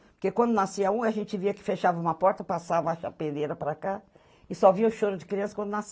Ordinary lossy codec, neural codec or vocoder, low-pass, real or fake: none; none; none; real